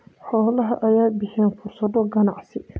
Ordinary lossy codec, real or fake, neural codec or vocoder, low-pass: none; real; none; none